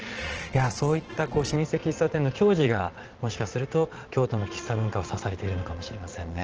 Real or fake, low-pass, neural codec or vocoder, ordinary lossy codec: fake; 7.2 kHz; vocoder, 22.05 kHz, 80 mel bands, Vocos; Opus, 16 kbps